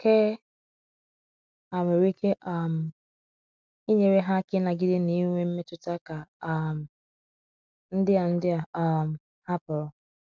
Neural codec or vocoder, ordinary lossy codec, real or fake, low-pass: none; none; real; none